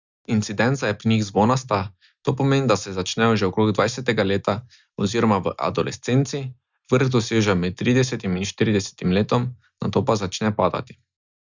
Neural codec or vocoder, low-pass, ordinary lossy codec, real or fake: none; 7.2 kHz; Opus, 64 kbps; real